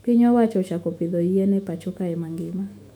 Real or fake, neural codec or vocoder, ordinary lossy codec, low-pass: fake; autoencoder, 48 kHz, 128 numbers a frame, DAC-VAE, trained on Japanese speech; none; 19.8 kHz